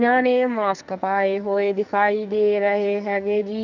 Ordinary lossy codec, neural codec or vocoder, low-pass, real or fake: none; codec, 44.1 kHz, 2.6 kbps, SNAC; 7.2 kHz; fake